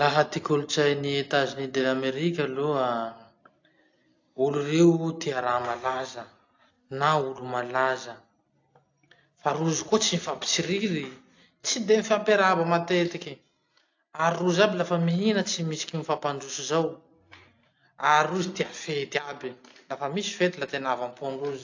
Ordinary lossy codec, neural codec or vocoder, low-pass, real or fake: AAC, 48 kbps; none; 7.2 kHz; real